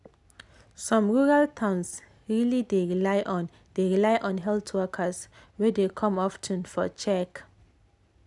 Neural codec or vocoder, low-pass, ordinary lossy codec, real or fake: none; 10.8 kHz; none; real